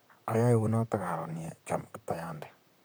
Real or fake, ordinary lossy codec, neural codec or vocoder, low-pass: fake; none; vocoder, 44.1 kHz, 128 mel bands, Pupu-Vocoder; none